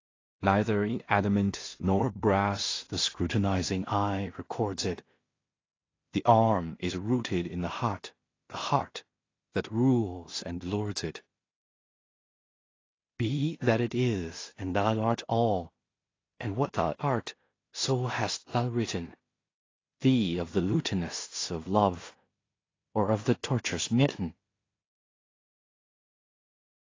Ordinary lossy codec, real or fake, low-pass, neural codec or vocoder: AAC, 32 kbps; fake; 7.2 kHz; codec, 16 kHz in and 24 kHz out, 0.4 kbps, LongCat-Audio-Codec, two codebook decoder